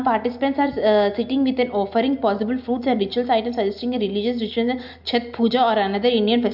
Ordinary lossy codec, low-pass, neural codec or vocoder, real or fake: MP3, 48 kbps; 5.4 kHz; none; real